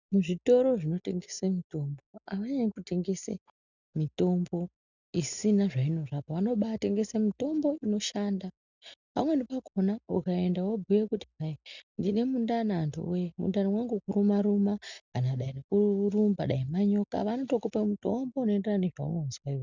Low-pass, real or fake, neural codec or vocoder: 7.2 kHz; real; none